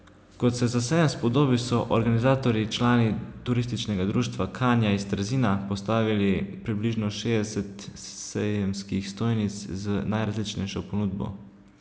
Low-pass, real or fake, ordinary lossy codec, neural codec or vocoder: none; real; none; none